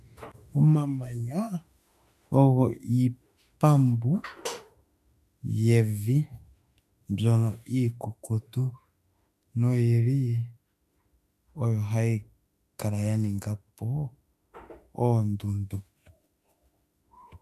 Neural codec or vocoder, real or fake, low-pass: autoencoder, 48 kHz, 32 numbers a frame, DAC-VAE, trained on Japanese speech; fake; 14.4 kHz